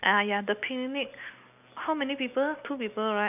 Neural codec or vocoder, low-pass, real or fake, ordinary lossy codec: none; 3.6 kHz; real; none